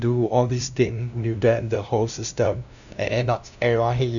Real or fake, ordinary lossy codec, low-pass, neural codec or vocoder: fake; none; 7.2 kHz; codec, 16 kHz, 0.5 kbps, FunCodec, trained on LibriTTS, 25 frames a second